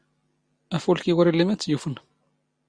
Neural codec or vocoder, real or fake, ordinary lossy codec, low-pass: none; real; Opus, 64 kbps; 9.9 kHz